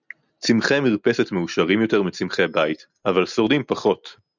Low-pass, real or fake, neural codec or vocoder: 7.2 kHz; real; none